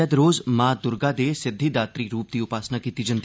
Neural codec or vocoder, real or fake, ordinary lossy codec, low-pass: none; real; none; none